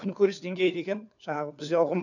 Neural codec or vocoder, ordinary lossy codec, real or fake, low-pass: codec, 16 kHz, 4 kbps, FunCodec, trained on LibriTTS, 50 frames a second; none; fake; 7.2 kHz